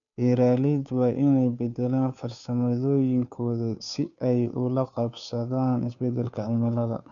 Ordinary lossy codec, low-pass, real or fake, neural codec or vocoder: none; 7.2 kHz; fake; codec, 16 kHz, 2 kbps, FunCodec, trained on Chinese and English, 25 frames a second